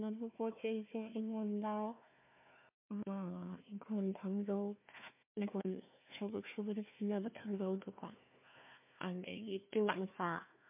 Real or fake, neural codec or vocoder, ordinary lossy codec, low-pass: fake; codec, 16 kHz, 1 kbps, FunCodec, trained on Chinese and English, 50 frames a second; MP3, 32 kbps; 3.6 kHz